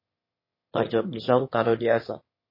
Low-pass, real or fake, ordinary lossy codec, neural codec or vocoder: 5.4 kHz; fake; MP3, 24 kbps; autoencoder, 22.05 kHz, a latent of 192 numbers a frame, VITS, trained on one speaker